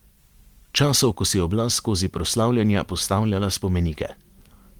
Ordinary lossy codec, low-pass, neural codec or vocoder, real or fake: Opus, 24 kbps; 19.8 kHz; vocoder, 44.1 kHz, 128 mel bands every 512 samples, BigVGAN v2; fake